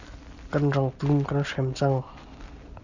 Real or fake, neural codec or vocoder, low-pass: real; none; 7.2 kHz